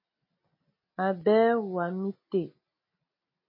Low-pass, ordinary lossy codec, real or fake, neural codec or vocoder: 5.4 kHz; MP3, 24 kbps; real; none